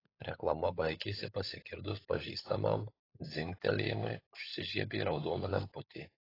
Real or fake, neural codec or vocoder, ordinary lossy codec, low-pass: fake; codec, 16 kHz, 16 kbps, FunCodec, trained on LibriTTS, 50 frames a second; AAC, 24 kbps; 5.4 kHz